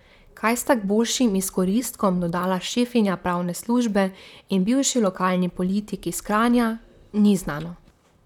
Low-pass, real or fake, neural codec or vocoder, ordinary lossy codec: 19.8 kHz; fake; vocoder, 44.1 kHz, 128 mel bands, Pupu-Vocoder; none